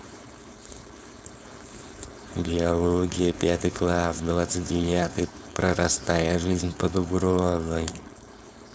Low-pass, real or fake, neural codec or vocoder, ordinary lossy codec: none; fake; codec, 16 kHz, 4.8 kbps, FACodec; none